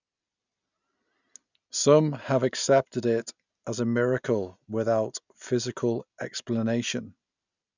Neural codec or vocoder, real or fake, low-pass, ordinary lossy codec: none; real; 7.2 kHz; none